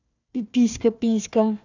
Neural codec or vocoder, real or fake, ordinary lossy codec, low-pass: codec, 16 kHz, 1 kbps, FunCodec, trained on Chinese and English, 50 frames a second; fake; none; 7.2 kHz